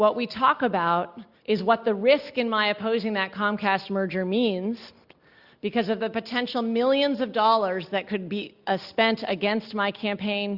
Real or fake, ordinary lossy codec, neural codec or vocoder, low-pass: real; Opus, 64 kbps; none; 5.4 kHz